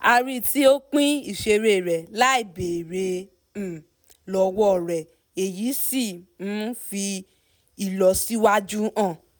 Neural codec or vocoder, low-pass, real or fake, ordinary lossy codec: none; none; real; none